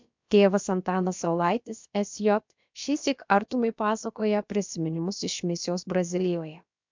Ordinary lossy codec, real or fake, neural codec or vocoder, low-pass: MP3, 64 kbps; fake; codec, 16 kHz, about 1 kbps, DyCAST, with the encoder's durations; 7.2 kHz